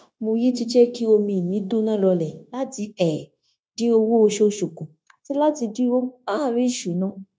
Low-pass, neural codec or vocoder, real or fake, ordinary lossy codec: none; codec, 16 kHz, 0.9 kbps, LongCat-Audio-Codec; fake; none